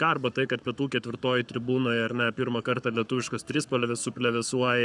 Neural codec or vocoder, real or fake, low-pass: codec, 44.1 kHz, 7.8 kbps, Pupu-Codec; fake; 10.8 kHz